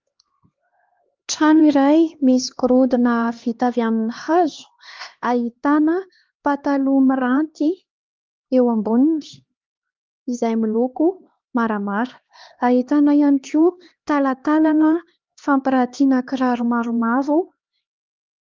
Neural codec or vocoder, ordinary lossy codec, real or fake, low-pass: codec, 16 kHz, 2 kbps, X-Codec, HuBERT features, trained on LibriSpeech; Opus, 24 kbps; fake; 7.2 kHz